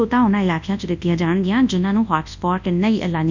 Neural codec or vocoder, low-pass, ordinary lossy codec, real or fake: codec, 24 kHz, 0.9 kbps, WavTokenizer, large speech release; 7.2 kHz; none; fake